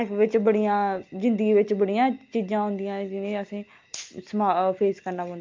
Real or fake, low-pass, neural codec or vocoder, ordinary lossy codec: real; 7.2 kHz; none; Opus, 32 kbps